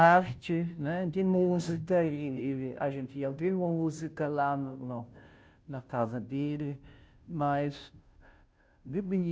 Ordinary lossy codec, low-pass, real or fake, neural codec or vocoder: none; none; fake; codec, 16 kHz, 0.5 kbps, FunCodec, trained on Chinese and English, 25 frames a second